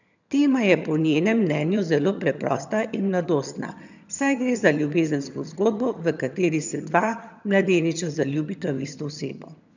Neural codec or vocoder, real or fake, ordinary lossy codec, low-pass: vocoder, 22.05 kHz, 80 mel bands, HiFi-GAN; fake; none; 7.2 kHz